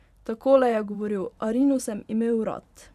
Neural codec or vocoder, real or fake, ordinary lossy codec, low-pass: vocoder, 44.1 kHz, 128 mel bands every 512 samples, BigVGAN v2; fake; none; 14.4 kHz